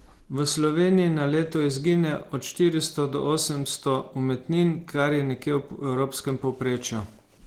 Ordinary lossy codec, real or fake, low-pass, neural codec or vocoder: Opus, 16 kbps; real; 19.8 kHz; none